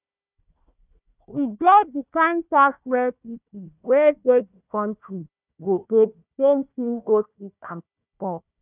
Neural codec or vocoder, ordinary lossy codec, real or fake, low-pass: codec, 16 kHz, 1 kbps, FunCodec, trained on Chinese and English, 50 frames a second; none; fake; 3.6 kHz